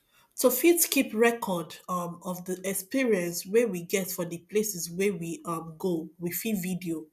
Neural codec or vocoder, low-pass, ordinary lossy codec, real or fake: none; 14.4 kHz; none; real